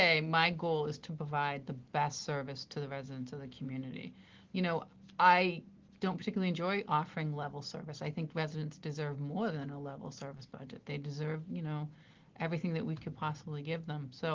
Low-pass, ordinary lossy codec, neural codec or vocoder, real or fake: 7.2 kHz; Opus, 16 kbps; none; real